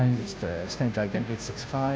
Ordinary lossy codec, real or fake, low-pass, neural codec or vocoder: none; fake; none; codec, 16 kHz, 0.5 kbps, FunCodec, trained on Chinese and English, 25 frames a second